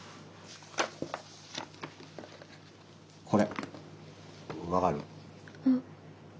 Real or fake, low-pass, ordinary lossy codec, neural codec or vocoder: real; none; none; none